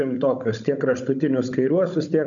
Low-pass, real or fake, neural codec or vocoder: 7.2 kHz; fake; codec, 16 kHz, 16 kbps, FunCodec, trained on Chinese and English, 50 frames a second